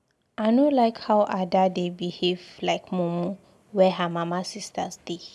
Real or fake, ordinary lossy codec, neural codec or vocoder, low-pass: real; none; none; none